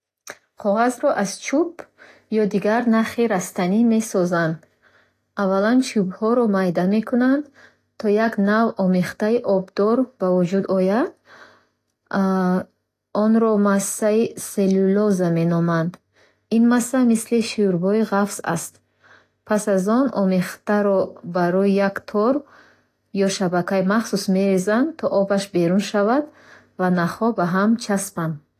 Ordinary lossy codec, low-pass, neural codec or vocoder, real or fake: AAC, 48 kbps; 14.4 kHz; none; real